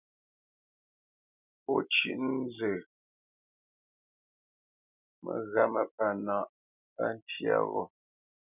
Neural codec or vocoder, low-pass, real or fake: none; 3.6 kHz; real